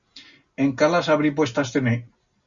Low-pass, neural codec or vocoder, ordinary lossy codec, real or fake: 7.2 kHz; none; Opus, 64 kbps; real